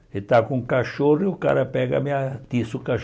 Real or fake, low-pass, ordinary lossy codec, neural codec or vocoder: real; none; none; none